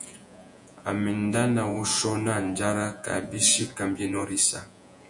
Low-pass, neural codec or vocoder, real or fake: 10.8 kHz; vocoder, 48 kHz, 128 mel bands, Vocos; fake